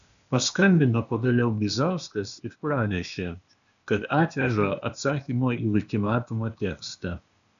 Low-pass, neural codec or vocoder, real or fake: 7.2 kHz; codec, 16 kHz, 0.8 kbps, ZipCodec; fake